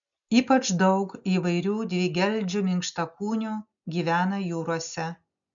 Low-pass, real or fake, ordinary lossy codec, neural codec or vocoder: 7.2 kHz; real; MP3, 96 kbps; none